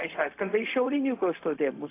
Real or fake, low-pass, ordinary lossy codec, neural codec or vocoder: fake; 3.6 kHz; AAC, 24 kbps; codec, 16 kHz, 0.4 kbps, LongCat-Audio-Codec